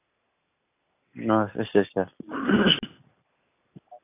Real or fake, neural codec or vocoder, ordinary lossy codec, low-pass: real; none; AAC, 24 kbps; 3.6 kHz